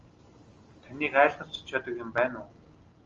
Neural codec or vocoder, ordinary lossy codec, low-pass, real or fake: none; Opus, 32 kbps; 7.2 kHz; real